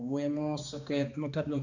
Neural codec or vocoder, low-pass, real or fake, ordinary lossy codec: codec, 16 kHz, 2 kbps, X-Codec, HuBERT features, trained on balanced general audio; 7.2 kHz; fake; none